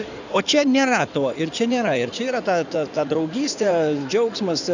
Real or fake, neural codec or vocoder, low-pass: fake; codec, 16 kHz in and 24 kHz out, 2.2 kbps, FireRedTTS-2 codec; 7.2 kHz